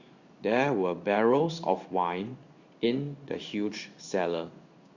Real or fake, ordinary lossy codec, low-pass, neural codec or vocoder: fake; Opus, 64 kbps; 7.2 kHz; codec, 16 kHz in and 24 kHz out, 1 kbps, XY-Tokenizer